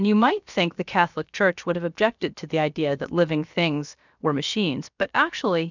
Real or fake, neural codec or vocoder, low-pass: fake; codec, 16 kHz, about 1 kbps, DyCAST, with the encoder's durations; 7.2 kHz